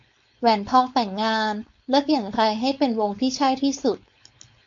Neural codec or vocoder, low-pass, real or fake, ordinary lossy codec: codec, 16 kHz, 4.8 kbps, FACodec; 7.2 kHz; fake; MP3, 48 kbps